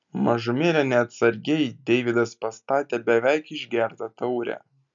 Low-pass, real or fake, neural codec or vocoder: 7.2 kHz; real; none